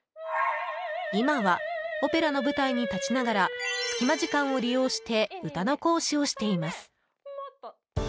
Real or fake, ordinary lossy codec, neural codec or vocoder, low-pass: real; none; none; none